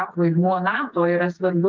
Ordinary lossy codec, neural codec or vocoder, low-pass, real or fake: Opus, 24 kbps; codec, 16 kHz, 4 kbps, FreqCodec, smaller model; 7.2 kHz; fake